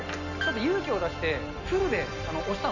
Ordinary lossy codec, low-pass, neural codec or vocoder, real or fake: none; 7.2 kHz; none; real